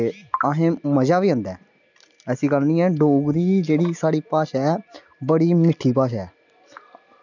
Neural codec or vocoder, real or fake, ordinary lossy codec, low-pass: none; real; none; 7.2 kHz